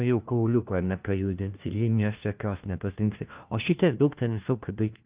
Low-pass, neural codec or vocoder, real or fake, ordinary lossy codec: 3.6 kHz; codec, 16 kHz, 1 kbps, FunCodec, trained on LibriTTS, 50 frames a second; fake; Opus, 24 kbps